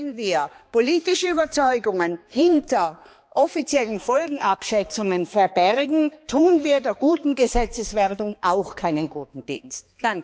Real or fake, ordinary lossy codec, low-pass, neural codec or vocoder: fake; none; none; codec, 16 kHz, 2 kbps, X-Codec, HuBERT features, trained on balanced general audio